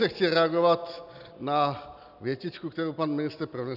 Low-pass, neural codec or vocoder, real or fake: 5.4 kHz; none; real